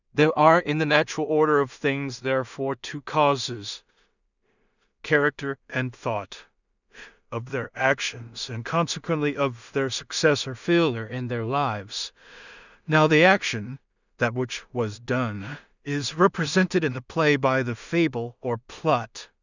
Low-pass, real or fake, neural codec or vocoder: 7.2 kHz; fake; codec, 16 kHz in and 24 kHz out, 0.4 kbps, LongCat-Audio-Codec, two codebook decoder